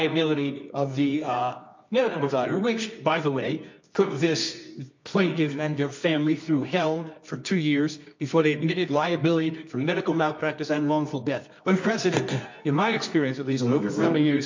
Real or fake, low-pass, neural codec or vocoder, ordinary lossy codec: fake; 7.2 kHz; codec, 24 kHz, 0.9 kbps, WavTokenizer, medium music audio release; MP3, 48 kbps